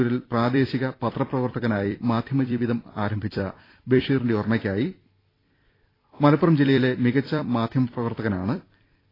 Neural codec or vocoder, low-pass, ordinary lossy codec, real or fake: vocoder, 44.1 kHz, 128 mel bands every 256 samples, BigVGAN v2; 5.4 kHz; AAC, 24 kbps; fake